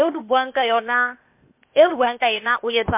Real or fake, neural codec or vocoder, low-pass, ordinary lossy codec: fake; codec, 16 kHz, 0.8 kbps, ZipCodec; 3.6 kHz; MP3, 32 kbps